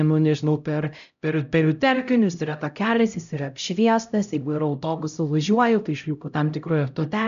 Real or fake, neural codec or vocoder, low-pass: fake; codec, 16 kHz, 0.5 kbps, X-Codec, HuBERT features, trained on LibriSpeech; 7.2 kHz